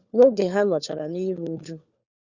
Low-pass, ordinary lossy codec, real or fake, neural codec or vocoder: 7.2 kHz; Opus, 64 kbps; fake; codec, 16 kHz, 4 kbps, FunCodec, trained on LibriTTS, 50 frames a second